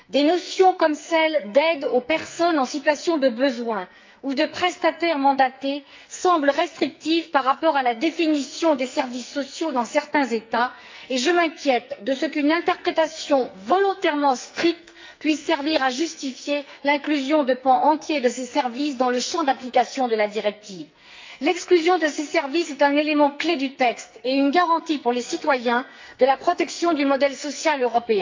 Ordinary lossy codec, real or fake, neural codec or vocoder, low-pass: MP3, 64 kbps; fake; codec, 44.1 kHz, 2.6 kbps, SNAC; 7.2 kHz